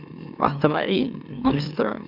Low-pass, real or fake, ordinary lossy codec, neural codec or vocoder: 5.4 kHz; fake; none; autoencoder, 44.1 kHz, a latent of 192 numbers a frame, MeloTTS